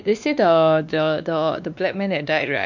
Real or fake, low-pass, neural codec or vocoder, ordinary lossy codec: fake; 7.2 kHz; codec, 16 kHz, 2 kbps, X-Codec, WavLM features, trained on Multilingual LibriSpeech; none